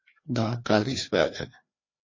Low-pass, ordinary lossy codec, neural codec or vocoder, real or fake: 7.2 kHz; MP3, 32 kbps; codec, 16 kHz, 2 kbps, FreqCodec, larger model; fake